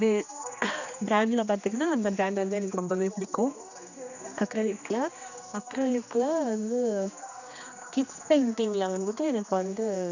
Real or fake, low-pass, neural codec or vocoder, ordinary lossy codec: fake; 7.2 kHz; codec, 16 kHz, 2 kbps, X-Codec, HuBERT features, trained on general audio; none